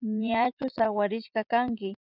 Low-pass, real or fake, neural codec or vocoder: 5.4 kHz; fake; vocoder, 44.1 kHz, 80 mel bands, Vocos